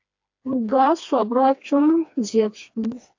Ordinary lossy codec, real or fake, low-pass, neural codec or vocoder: AAC, 48 kbps; fake; 7.2 kHz; codec, 16 kHz, 2 kbps, FreqCodec, smaller model